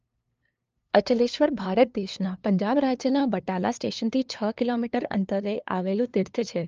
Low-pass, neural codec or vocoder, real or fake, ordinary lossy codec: 7.2 kHz; codec, 16 kHz, 2 kbps, FunCodec, trained on LibriTTS, 25 frames a second; fake; Opus, 24 kbps